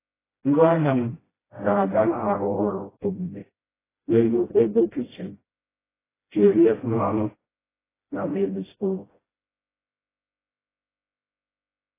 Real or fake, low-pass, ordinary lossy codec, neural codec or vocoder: fake; 3.6 kHz; AAC, 16 kbps; codec, 16 kHz, 0.5 kbps, FreqCodec, smaller model